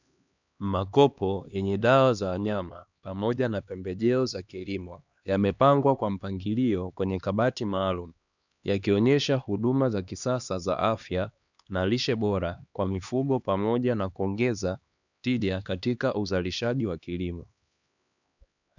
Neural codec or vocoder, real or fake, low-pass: codec, 16 kHz, 2 kbps, X-Codec, HuBERT features, trained on LibriSpeech; fake; 7.2 kHz